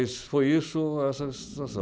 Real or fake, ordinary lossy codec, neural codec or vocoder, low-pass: real; none; none; none